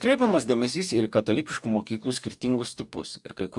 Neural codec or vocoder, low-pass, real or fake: codec, 44.1 kHz, 2.6 kbps, DAC; 10.8 kHz; fake